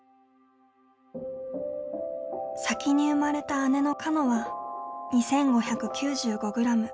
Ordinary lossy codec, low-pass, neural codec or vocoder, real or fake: none; none; none; real